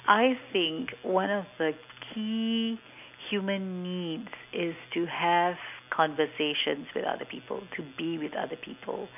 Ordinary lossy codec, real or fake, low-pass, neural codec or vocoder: none; real; 3.6 kHz; none